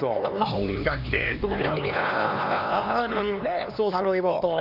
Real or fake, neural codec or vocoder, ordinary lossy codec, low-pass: fake; codec, 16 kHz, 2 kbps, X-Codec, HuBERT features, trained on LibriSpeech; none; 5.4 kHz